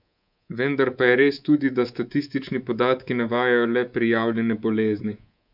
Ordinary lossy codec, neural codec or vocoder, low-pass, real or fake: none; codec, 24 kHz, 3.1 kbps, DualCodec; 5.4 kHz; fake